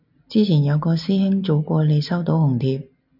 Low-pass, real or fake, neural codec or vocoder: 5.4 kHz; real; none